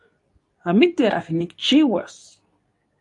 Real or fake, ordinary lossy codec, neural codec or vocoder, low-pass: fake; AAC, 64 kbps; codec, 24 kHz, 0.9 kbps, WavTokenizer, medium speech release version 2; 10.8 kHz